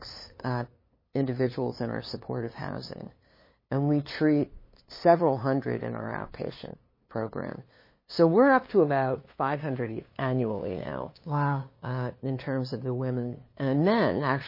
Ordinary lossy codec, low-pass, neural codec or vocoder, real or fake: MP3, 24 kbps; 5.4 kHz; codec, 16 kHz, 2 kbps, FunCodec, trained on LibriTTS, 25 frames a second; fake